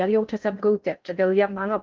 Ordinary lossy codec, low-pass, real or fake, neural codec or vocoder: Opus, 24 kbps; 7.2 kHz; fake; codec, 16 kHz in and 24 kHz out, 0.6 kbps, FocalCodec, streaming, 4096 codes